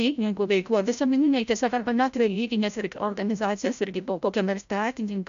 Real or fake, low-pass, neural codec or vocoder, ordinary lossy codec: fake; 7.2 kHz; codec, 16 kHz, 0.5 kbps, FreqCodec, larger model; AAC, 96 kbps